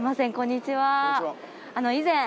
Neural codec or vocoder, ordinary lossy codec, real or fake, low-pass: none; none; real; none